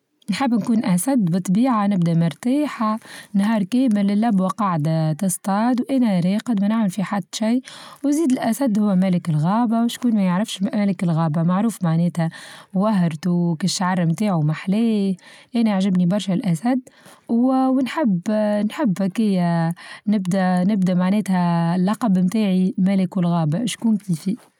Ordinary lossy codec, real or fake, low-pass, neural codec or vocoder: none; real; 19.8 kHz; none